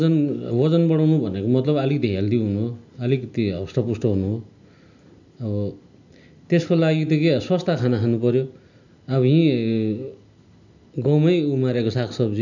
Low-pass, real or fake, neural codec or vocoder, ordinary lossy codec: 7.2 kHz; real; none; none